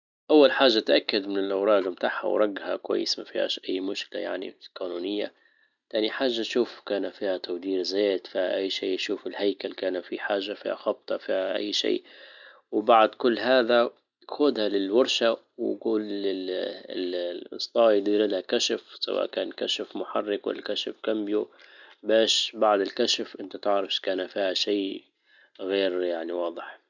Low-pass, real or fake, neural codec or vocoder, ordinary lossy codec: 7.2 kHz; real; none; none